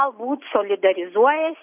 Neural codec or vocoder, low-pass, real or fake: none; 3.6 kHz; real